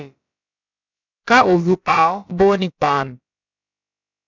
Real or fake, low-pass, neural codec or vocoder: fake; 7.2 kHz; codec, 16 kHz, about 1 kbps, DyCAST, with the encoder's durations